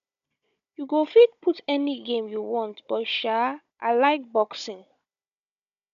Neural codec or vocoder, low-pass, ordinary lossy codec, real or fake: codec, 16 kHz, 16 kbps, FunCodec, trained on Chinese and English, 50 frames a second; 7.2 kHz; none; fake